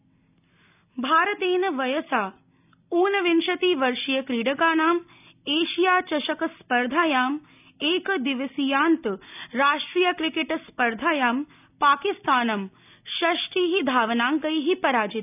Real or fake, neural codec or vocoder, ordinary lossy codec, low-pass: real; none; none; 3.6 kHz